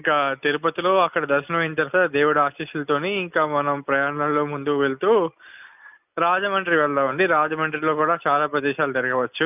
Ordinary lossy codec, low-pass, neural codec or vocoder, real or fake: none; 3.6 kHz; none; real